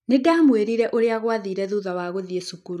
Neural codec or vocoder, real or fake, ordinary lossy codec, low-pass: none; real; none; 14.4 kHz